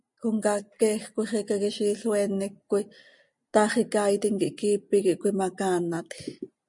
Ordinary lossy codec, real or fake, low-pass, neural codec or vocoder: MP3, 64 kbps; real; 10.8 kHz; none